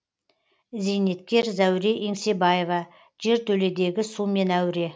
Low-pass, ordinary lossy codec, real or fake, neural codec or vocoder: none; none; real; none